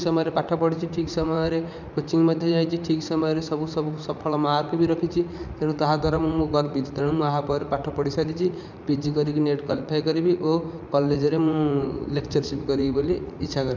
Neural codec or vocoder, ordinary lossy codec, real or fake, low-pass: vocoder, 44.1 kHz, 80 mel bands, Vocos; none; fake; 7.2 kHz